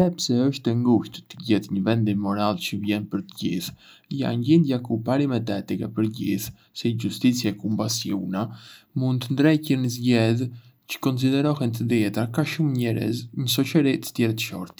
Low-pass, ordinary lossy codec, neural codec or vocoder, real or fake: none; none; none; real